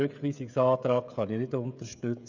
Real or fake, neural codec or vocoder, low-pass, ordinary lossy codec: fake; codec, 16 kHz, 16 kbps, FreqCodec, smaller model; 7.2 kHz; none